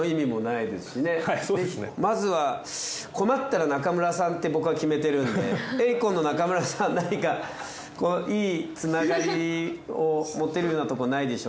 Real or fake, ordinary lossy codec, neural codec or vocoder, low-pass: real; none; none; none